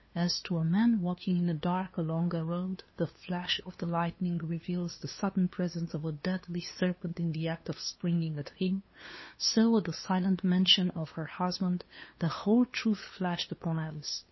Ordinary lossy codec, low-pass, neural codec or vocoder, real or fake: MP3, 24 kbps; 7.2 kHz; codec, 16 kHz, 2 kbps, FunCodec, trained on LibriTTS, 25 frames a second; fake